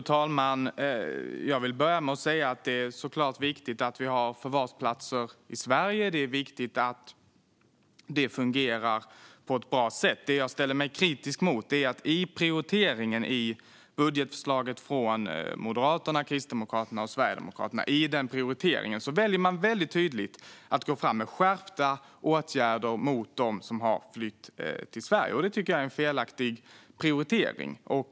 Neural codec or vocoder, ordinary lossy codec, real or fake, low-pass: none; none; real; none